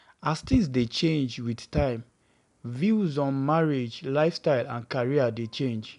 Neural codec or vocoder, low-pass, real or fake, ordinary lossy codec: none; 10.8 kHz; real; none